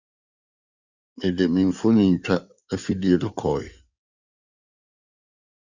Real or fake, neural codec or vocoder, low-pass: fake; codec, 16 kHz in and 24 kHz out, 2.2 kbps, FireRedTTS-2 codec; 7.2 kHz